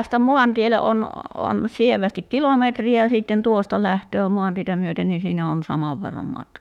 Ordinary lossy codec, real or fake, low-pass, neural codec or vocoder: none; fake; 19.8 kHz; autoencoder, 48 kHz, 32 numbers a frame, DAC-VAE, trained on Japanese speech